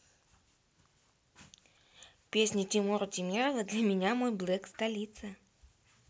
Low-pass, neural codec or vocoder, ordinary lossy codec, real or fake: none; none; none; real